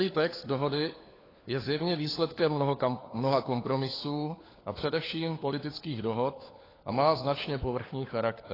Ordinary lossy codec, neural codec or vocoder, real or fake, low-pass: AAC, 24 kbps; codec, 16 kHz, 4 kbps, FunCodec, trained on LibriTTS, 50 frames a second; fake; 5.4 kHz